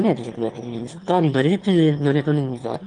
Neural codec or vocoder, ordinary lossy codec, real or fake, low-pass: autoencoder, 22.05 kHz, a latent of 192 numbers a frame, VITS, trained on one speaker; Opus, 24 kbps; fake; 9.9 kHz